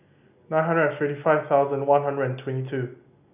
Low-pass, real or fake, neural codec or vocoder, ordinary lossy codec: 3.6 kHz; real; none; none